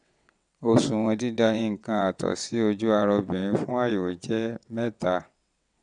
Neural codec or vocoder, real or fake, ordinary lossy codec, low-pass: vocoder, 22.05 kHz, 80 mel bands, WaveNeXt; fake; none; 9.9 kHz